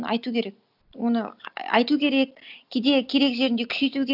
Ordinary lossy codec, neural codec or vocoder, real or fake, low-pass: none; none; real; 5.4 kHz